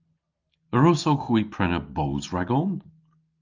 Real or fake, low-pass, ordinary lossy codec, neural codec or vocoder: real; 7.2 kHz; Opus, 24 kbps; none